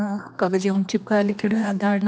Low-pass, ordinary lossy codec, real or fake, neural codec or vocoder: none; none; fake; codec, 16 kHz, 2 kbps, X-Codec, HuBERT features, trained on general audio